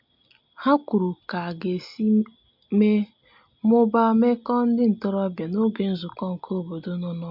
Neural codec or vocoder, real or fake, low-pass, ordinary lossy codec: none; real; 5.4 kHz; MP3, 48 kbps